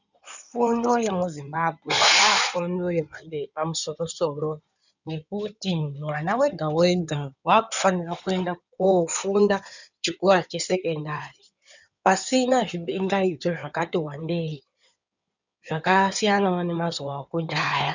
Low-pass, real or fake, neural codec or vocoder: 7.2 kHz; fake; codec, 16 kHz in and 24 kHz out, 2.2 kbps, FireRedTTS-2 codec